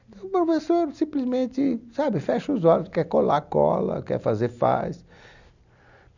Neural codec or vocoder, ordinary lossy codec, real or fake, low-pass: none; none; real; 7.2 kHz